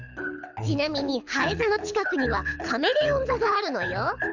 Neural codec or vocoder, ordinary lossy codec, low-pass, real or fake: codec, 24 kHz, 6 kbps, HILCodec; none; 7.2 kHz; fake